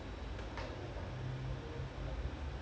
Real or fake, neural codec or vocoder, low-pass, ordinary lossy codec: real; none; none; none